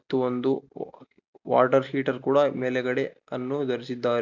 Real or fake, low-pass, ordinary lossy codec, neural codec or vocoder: real; 7.2 kHz; none; none